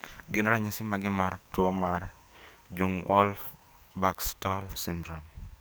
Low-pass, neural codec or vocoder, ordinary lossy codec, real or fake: none; codec, 44.1 kHz, 2.6 kbps, SNAC; none; fake